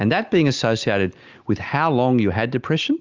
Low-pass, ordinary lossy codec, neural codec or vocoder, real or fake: 7.2 kHz; Opus, 32 kbps; none; real